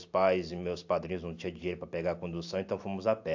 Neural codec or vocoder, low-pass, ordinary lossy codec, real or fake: none; 7.2 kHz; AAC, 48 kbps; real